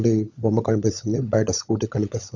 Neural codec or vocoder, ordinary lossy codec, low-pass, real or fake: codec, 16 kHz, 16 kbps, FunCodec, trained on LibriTTS, 50 frames a second; none; 7.2 kHz; fake